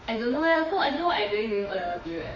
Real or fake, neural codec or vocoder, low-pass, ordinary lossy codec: fake; autoencoder, 48 kHz, 32 numbers a frame, DAC-VAE, trained on Japanese speech; 7.2 kHz; none